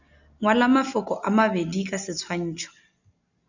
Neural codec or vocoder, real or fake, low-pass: none; real; 7.2 kHz